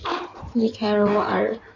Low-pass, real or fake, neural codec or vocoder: 7.2 kHz; fake; codec, 16 kHz in and 24 kHz out, 2.2 kbps, FireRedTTS-2 codec